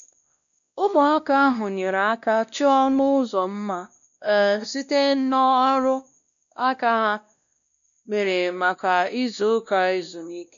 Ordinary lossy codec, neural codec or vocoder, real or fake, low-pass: none; codec, 16 kHz, 1 kbps, X-Codec, WavLM features, trained on Multilingual LibriSpeech; fake; 7.2 kHz